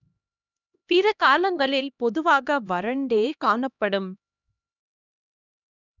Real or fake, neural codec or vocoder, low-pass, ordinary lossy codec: fake; codec, 16 kHz, 1 kbps, X-Codec, HuBERT features, trained on LibriSpeech; 7.2 kHz; none